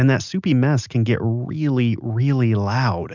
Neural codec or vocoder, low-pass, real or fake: none; 7.2 kHz; real